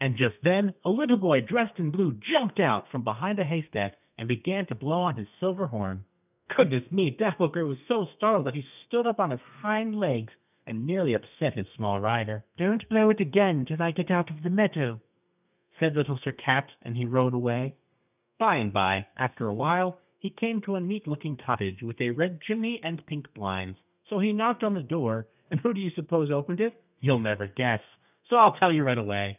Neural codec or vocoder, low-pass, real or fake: codec, 32 kHz, 1.9 kbps, SNAC; 3.6 kHz; fake